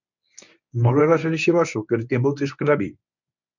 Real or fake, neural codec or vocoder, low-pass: fake; codec, 24 kHz, 0.9 kbps, WavTokenizer, medium speech release version 1; 7.2 kHz